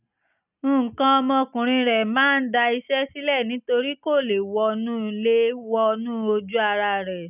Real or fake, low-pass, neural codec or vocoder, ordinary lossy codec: real; 3.6 kHz; none; none